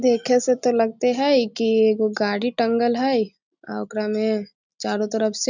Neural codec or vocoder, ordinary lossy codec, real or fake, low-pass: none; none; real; 7.2 kHz